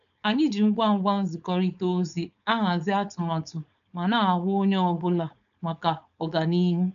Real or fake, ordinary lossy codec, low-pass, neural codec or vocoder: fake; none; 7.2 kHz; codec, 16 kHz, 4.8 kbps, FACodec